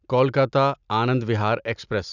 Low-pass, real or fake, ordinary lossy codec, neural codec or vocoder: 7.2 kHz; real; none; none